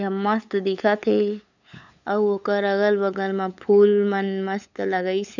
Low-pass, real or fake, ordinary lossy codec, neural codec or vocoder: 7.2 kHz; fake; none; codec, 16 kHz, 8 kbps, FunCodec, trained on LibriTTS, 25 frames a second